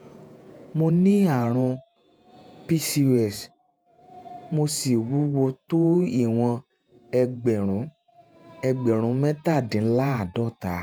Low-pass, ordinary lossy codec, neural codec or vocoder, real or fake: none; none; vocoder, 48 kHz, 128 mel bands, Vocos; fake